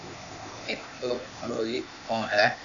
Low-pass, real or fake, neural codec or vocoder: 7.2 kHz; fake; codec, 16 kHz, 0.8 kbps, ZipCodec